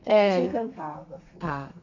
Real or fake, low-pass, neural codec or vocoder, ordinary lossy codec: fake; 7.2 kHz; codec, 32 kHz, 1.9 kbps, SNAC; none